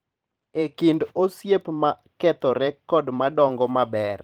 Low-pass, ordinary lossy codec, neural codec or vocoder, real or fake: 19.8 kHz; Opus, 24 kbps; vocoder, 44.1 kHz, 128 mel bands every 512 samples, BigVGAN v2; fake